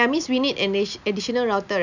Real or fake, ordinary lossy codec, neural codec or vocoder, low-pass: real; none; none; 7.2 kHz